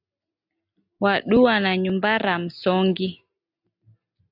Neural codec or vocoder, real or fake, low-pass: none; real; 5.4 kHz